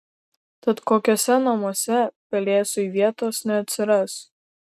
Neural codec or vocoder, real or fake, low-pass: none; real; 14.4 kHz